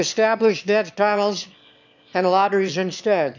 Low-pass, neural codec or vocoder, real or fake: 7.2 kHz; autoencoder, 22.05 kHz, a latent of 192 numbers a frame, VITS, trained on one speaker; fake